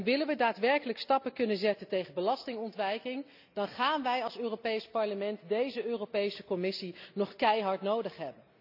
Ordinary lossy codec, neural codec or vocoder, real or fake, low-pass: none; none; real; 5.4 kHz